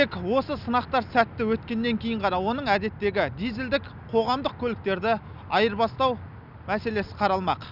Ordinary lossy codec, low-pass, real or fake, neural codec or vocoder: none; 5.4 kHz; real; none